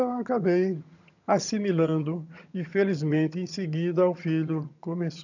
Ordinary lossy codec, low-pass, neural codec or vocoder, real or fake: none; 7.2 kHz; vocoder, 22.05 kHz, 80 mel bands, HiFi-GAN; fake